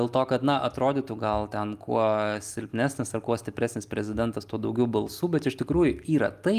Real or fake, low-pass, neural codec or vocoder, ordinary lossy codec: real; 14.4 kHz; none; Opus, 32 kbps